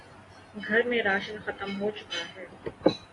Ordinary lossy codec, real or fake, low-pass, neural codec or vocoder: AAC, 32 kbps; real; 10.8 kHz; none